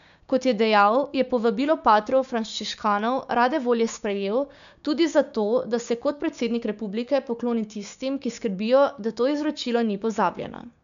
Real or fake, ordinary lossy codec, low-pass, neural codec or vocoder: fake; none; 7.2 kHz; codec, 16 kHz, 6 kbps, DAC